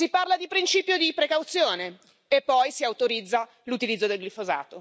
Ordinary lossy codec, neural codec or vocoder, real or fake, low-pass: none; none; real; none